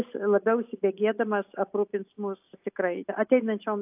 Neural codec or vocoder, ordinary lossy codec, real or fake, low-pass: none; AAC, 32 kbps; real; 3.6 kHz